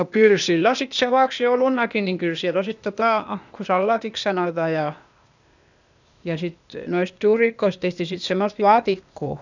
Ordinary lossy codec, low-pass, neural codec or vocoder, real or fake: none; 7.2 kHz; codec, 16 kHz, 0.8 kbps, ZipCodec; fake